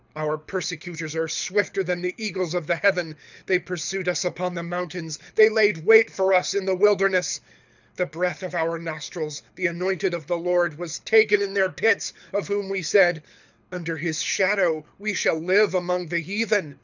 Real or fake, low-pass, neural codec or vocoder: fake; 7.2 kHz; codec, 24 kHz, 6 kbps, HILCodec